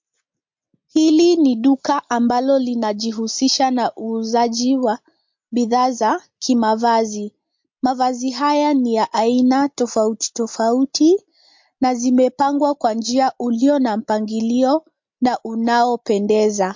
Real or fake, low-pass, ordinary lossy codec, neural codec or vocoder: real; 7.2 kHz; MP3, 48 kbps; none